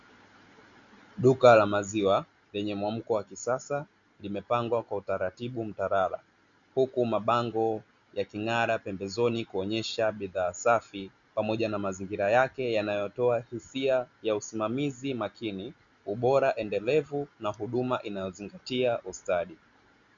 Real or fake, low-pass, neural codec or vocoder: real; 7.2 kHz; none